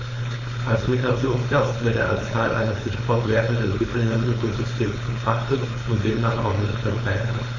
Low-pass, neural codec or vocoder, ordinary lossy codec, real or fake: 7.2 kHz; codec, 16 kHz, 4.8 kbps, FACodec; AAC, 32 kbps; fake